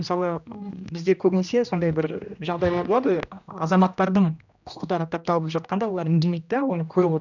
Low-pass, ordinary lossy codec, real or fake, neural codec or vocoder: 7.2 kHz; none; fake; codec, 16 kHz, 1 kbps, X-Codec, HuBERT features, trained on general audio